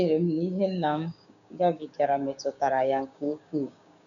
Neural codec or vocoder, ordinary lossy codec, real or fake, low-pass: codec, 16 kHz, 6 kbps, DAC; none; fake; 7.2 kHz